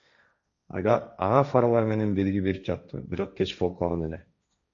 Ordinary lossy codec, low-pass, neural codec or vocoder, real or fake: AAC, 64 kbps; 7.2 kHz; codec, 16 kHz, 1.1 kbps, Voila-Tokenizer; fake